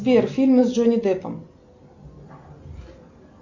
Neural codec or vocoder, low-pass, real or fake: none; 7.2 kHz; real